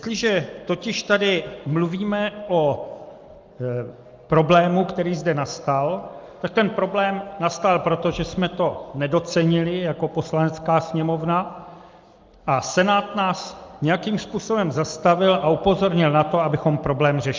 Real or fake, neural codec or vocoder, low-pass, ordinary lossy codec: real; none; 7.2 kHz; Opus, 24 kbps